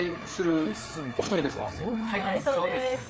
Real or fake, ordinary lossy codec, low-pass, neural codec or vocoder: fake; none; none; codec, 16 kHz, 4 kbps, FreqCodec, larger model